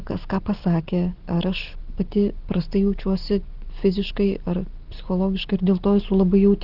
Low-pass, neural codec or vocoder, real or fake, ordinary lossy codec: 5.4 kHz; none; real; Opus, 16 kbps